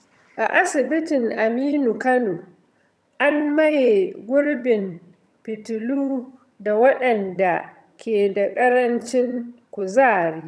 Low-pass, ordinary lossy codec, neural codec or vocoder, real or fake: none; none; vocoder, 22.05 kHz, 80 mel bands, HiFi-GAN; fake